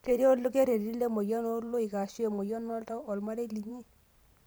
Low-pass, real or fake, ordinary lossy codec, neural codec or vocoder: none; fake; none; vocoder, 44.1 kHz, 128 mel bands every 512 samples, BigVGAN v2